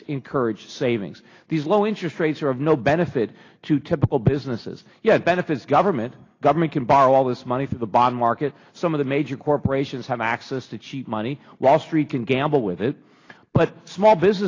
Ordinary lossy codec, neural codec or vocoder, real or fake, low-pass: AAC, 32 kbps; none; real; 7.2 kHz